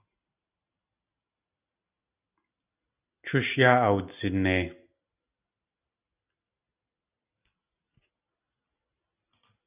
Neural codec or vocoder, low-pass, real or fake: none; 3.6 kHz; real